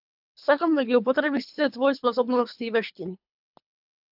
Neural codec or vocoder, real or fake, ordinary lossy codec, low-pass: codec, 24 kHz, 3 kbps, HILCodec; fake; AAC, 48 kbps; 5.4 kHz